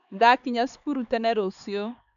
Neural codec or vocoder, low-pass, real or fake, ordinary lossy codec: codec, 16 kHz, 4 kbps, X-Codec, HuBERT features, trained on LibriSpeech; 7.2 kHz; fake; none